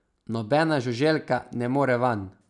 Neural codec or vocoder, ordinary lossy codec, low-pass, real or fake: none; none; 10.8 kHz; real